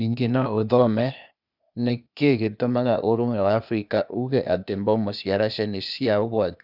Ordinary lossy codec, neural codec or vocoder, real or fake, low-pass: none; codec, 16 kHz, 0.8 kbps, ZipCodec; fake; 5.4 kHz